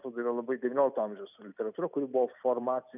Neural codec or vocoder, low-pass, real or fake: none; 3.6 kHz; real